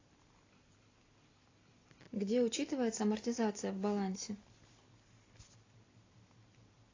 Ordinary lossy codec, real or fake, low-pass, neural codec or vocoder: AAC, 32 kbps; real; 7.2 kHz; none